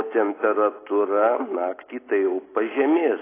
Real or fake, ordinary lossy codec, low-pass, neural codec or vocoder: real; AAC, 16 kbps; 3.6 kHz; none